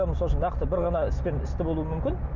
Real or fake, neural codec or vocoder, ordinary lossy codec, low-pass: real; none; none; 7.2 kHz